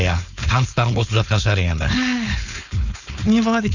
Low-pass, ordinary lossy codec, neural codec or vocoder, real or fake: 7.2 kHz; none; codec, 16 kHz, 4.8 kbps, FACodec; fake